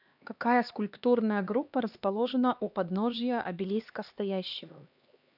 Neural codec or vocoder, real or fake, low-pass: codec, 16 kHz, 2 kbps, X-Codec, HuBERT features, trained on LibriSpeech; fake; 5.4 kHz